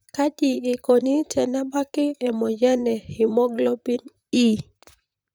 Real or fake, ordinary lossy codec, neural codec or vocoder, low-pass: fake; none; vocoder, 44.1 kHz, 128 mel bands, Pupu-Vocoder; none